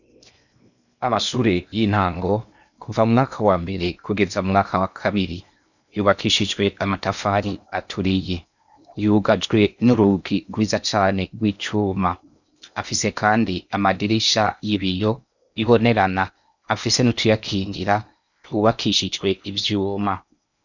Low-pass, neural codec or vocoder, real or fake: 7.2 kHz; codec, 16 kHz in and 24 kHz out, 0.8 kbps, FocalCodec, streaming, 65536 codes; fake